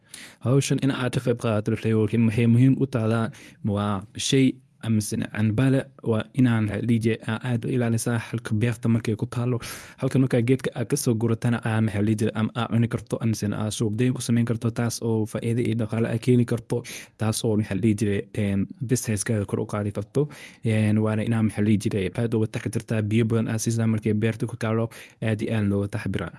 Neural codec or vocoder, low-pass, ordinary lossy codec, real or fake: codec, 24 kHz, 0.9 kbps, WavTokenizer, medium speech release version 1; none; none; fake